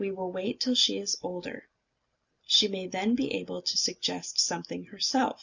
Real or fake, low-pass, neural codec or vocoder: real; 7.2 kHz; none